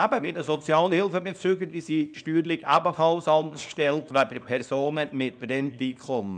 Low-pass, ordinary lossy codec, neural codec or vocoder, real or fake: 9.9 kHz; none; codec, 24 kHz, 0.9 kbps, WavTokenizer, small release; fake